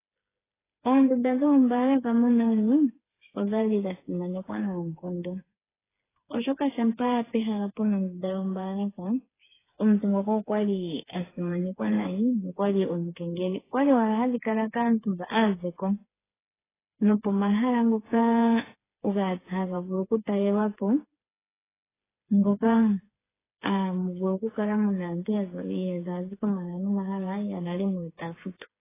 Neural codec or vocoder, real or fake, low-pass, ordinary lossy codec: codec, 16 kHz, 4 kbps, FreqCodec, smaller model; fake; 3.6 kHz; AAC, 16 kbps